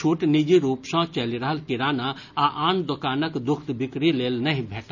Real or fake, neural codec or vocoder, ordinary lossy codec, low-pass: real; none; none; 7.2 kHz